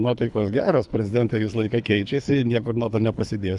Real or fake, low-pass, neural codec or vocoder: fake; 10.8 kHz; codec, 24 kHz, 3 kbps, HILCodec